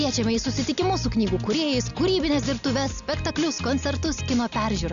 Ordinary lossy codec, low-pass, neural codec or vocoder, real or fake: MP3, 96 kbps; 7.2 kHz; none; real